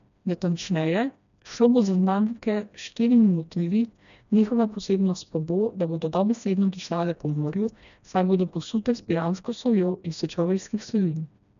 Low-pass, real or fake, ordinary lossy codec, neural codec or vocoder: 7.2 kHz; fake; none; codec, 16 kHz, 1 kbps, FreqCodec, smaller model